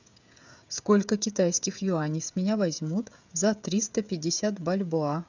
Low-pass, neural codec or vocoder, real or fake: 7.2 kHz; codec, 16 kHz, 16 kbps, FreqCodec, smaller model; fake